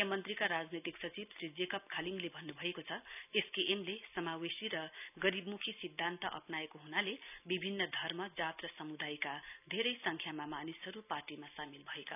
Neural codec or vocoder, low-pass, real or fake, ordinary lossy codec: none; 3.6 kHz; real; none